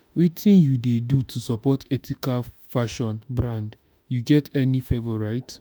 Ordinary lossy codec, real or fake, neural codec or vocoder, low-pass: none; fake; autoencoder, 48 kHz, 32 numbers a frame, DAC-VAE, trained on Japanese speech; none